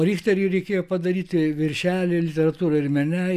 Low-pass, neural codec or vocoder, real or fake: 14.4 kHz; none; real